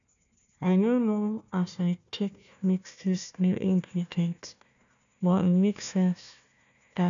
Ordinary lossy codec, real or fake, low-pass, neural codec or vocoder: none; fake; 7.2 kHz; codec, 16 kHz, 1 kbps, FunCodec, trained on Chinese and English, 50 frames a second